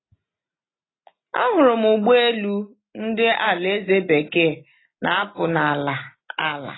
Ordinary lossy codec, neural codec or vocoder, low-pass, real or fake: AAC, 16 kbps; none; 7.2 kHz; real